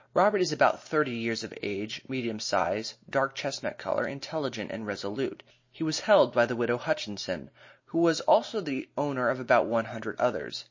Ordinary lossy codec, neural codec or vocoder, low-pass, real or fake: MP3, 32 kbps; none; 7.2 kHz; real